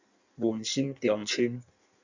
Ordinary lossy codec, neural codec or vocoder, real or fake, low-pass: Opus, 64 kbps; codec, 16 kHz in and 24 kHz out, 1.1 kbps, FireRedTTS-2 codec; fake; 7.2 kHz